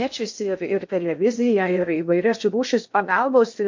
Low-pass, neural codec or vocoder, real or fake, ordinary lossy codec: 7.2 kHz; codec, 16 kHz in and 24 kHz out, 0.6 kbps, FocalCodec, streaming, 2048 codes; fake; MP3, 48 kbps